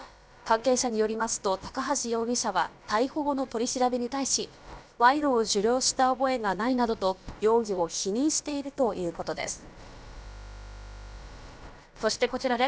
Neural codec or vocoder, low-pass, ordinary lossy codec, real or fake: codec, 16 kHz, about 1 kbps, DyCAST, with the encoder's durations; none; none; fake